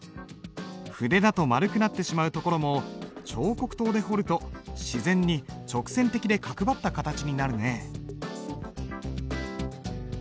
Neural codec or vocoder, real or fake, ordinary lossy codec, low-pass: none; real; none; none